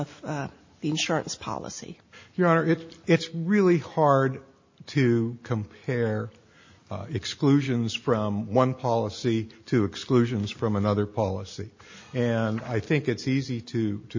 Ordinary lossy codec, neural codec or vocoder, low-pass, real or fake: MP3, 32 kbps; none; 7.2 kHz; real